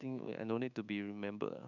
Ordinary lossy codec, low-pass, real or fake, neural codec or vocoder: none; 7.2 kHz; fake; codec, 24 kHz, 1.2 kbps, DualCodec